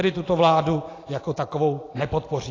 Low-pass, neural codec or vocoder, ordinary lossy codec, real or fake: 7.2 kHz; vocoder, 44.1 kHz, 128 mel bands every 256 samples, BigVGAN v2; AAC, 32 kbps; fake